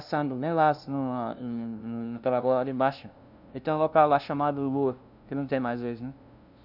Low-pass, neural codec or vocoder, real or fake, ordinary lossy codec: 5.4 kHz; codec, 16 kHz, 0.5 kbps, FunCodec, trained on LibriTTS, 25 frames a second; fake; none